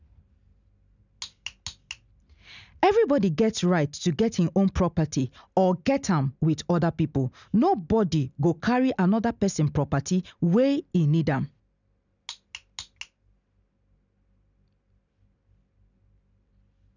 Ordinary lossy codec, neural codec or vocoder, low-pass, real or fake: none; none; 7.2 kHz; real